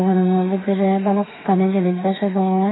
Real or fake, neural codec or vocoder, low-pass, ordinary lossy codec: fake; autoencoder, 48 kHz, 32 numbers a frame, DAC-VAE, trained on Japanese speech; 7.2 kHz; AAC, 16 kbps